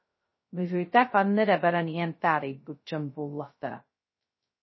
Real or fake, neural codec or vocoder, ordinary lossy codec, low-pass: fake; codec, 16 kHz, 0.2 kbps, FocalCodec; MP3, 24 kbps; 7.2 kHz